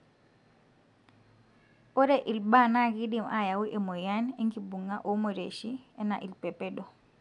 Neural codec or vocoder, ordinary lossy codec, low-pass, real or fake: none; none; 10.8 kHz; real